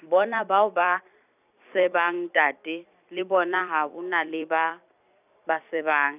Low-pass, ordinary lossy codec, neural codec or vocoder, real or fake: 3.6 kHz; none; vocoder, 44.1 kHz, 128 mel bands every 256 samples, BigVGAN v2; fake